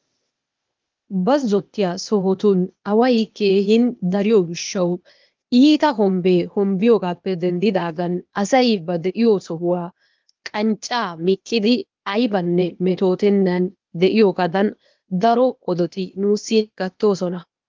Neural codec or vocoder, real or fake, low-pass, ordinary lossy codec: codec, 16 kHz, 0.8 kbps, ZipCodec; fake; 7.2 kHz; Opus, 32 kbps